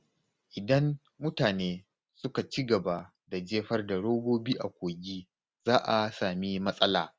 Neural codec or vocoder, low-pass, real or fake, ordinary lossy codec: none; none; real; none